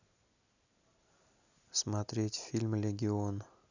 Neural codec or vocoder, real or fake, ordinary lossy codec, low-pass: none; real; none; 7.2 kHz